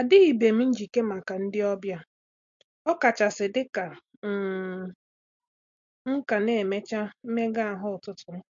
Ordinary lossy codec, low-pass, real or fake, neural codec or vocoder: MP3, 48 kbps; 7.2 kHz; real; none